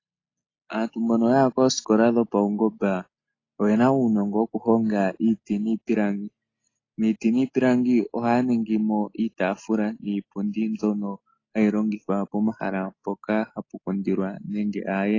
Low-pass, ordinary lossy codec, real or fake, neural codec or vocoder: 7.2 kHz; AAC, 32 kbps; real; none